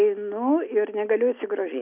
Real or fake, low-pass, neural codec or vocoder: real; 3.6 kHz; none